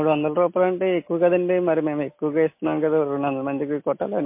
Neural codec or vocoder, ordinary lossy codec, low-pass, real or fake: none; MP3, 24 kbps; 3.6 kHz; real